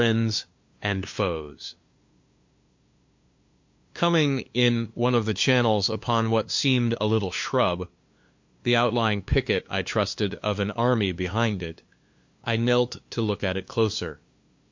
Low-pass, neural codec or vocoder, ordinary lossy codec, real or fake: 7.2 kHz; codec, 16 kHz, 2 kbps, FunCodec, trained on LibriTTS, 25 frames a second; MP3, 48 kbps; fake